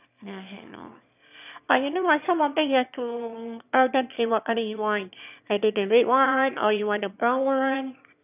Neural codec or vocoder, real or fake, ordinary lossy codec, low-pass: autoencoder, 22.05 kHz, a latent of 192 numbers a frame, VITS, trained on one speaker; fake; none; 3.6 kHz